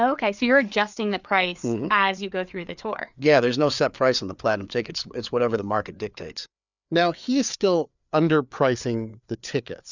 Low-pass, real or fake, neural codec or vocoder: 7.2 kHz; fake; codec, 16 kHz, 4 kbps, FreqCodec, larger model